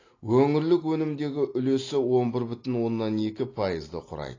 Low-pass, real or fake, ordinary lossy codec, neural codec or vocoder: 7.2 kHz; real; AAC, 32 kbps; none